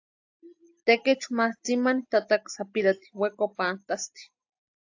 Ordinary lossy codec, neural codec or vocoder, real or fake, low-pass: AAC, 48 kbps; none; real; 7.2 kHz